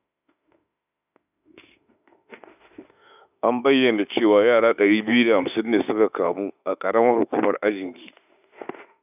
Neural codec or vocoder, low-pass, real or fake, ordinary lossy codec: autoencoder, 48 kHz, 32 numbers a frame, DAC-VAE, trained on Japanese speech; 3.6 kHz; fake; none